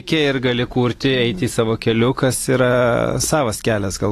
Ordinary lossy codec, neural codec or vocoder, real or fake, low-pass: AAC, 48 kbps; none; real; 14.4 kHz